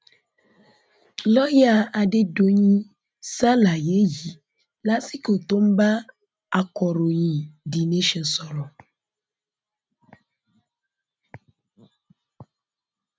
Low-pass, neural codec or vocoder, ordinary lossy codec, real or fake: none; none; none; real